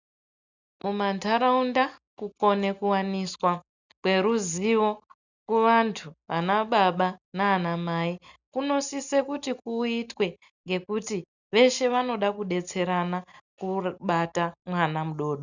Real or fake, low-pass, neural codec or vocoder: real; 7.2 kHz; none